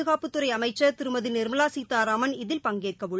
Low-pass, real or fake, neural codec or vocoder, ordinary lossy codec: none; real; none; none